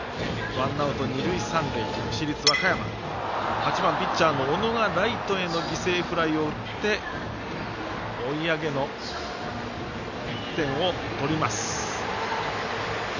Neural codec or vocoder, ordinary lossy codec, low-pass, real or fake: none; none; 7.2 kHz; real